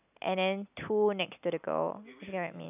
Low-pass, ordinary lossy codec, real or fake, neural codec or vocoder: 3.6 kHz; none; real; none